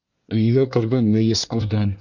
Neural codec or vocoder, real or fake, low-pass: codec, 24 kHz, 1 kbps, SNAC; fake; 7.2 kHz